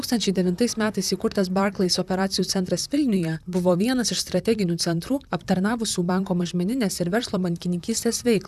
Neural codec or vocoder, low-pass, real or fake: vocoder, 44.1 kHz, 128 mel bands, Pupu-Vocoder; 14.4 kHz; fake